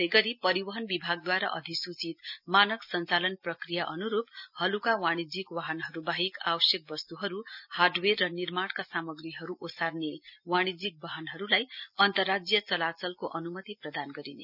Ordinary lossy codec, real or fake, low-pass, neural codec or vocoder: none; real; 5.4 kHz; none